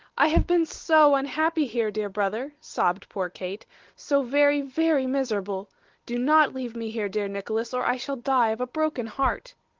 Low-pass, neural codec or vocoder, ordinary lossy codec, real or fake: 7.2 kHz; none; Opus, 16 kbps; real